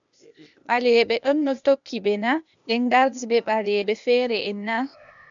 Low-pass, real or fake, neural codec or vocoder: 7.2 kHz; fake; codec, 16 kHz, 0.8 kbps, ZipCodec